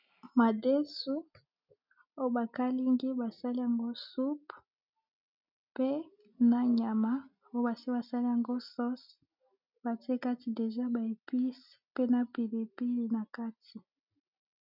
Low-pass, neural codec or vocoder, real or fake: 5.4 kHz; none; real